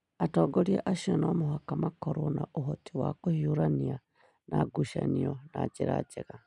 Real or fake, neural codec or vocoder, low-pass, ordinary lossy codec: real; none; 10.8 kHz; none